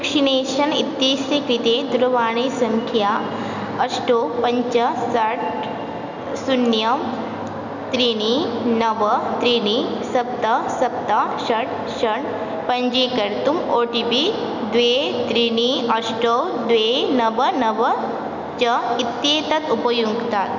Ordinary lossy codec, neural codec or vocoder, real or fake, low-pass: none; none; real; 7.2 kHz